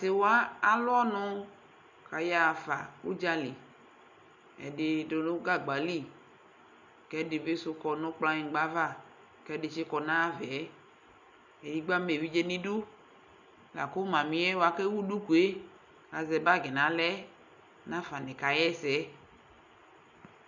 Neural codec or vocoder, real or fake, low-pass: none; real; 7.2 kHz